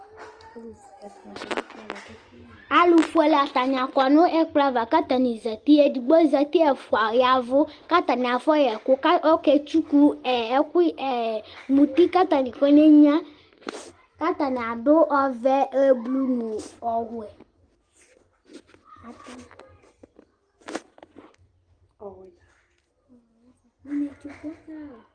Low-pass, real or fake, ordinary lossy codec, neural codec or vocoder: 9.9 kHz; real; Opus, 24 kbps; none